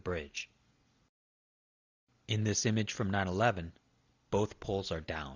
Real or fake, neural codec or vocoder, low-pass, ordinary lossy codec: real; none; 7.2 kHz; Opus, 64 kbps